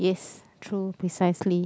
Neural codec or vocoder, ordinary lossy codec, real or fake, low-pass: none; none; real; none